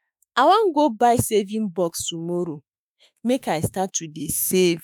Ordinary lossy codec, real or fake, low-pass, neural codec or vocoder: none; fake; none; autoencoder, 48 kHz, 32 numbers a frame, DAC-VAE, trained on Japanese speech